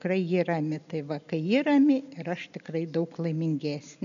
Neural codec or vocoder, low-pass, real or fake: none; 7.2 kHz; real